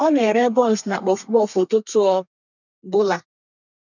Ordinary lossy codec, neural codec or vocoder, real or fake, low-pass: none; codec, 32 kHz, 1.9 kbps, SNAC; fake; 7.2 kHz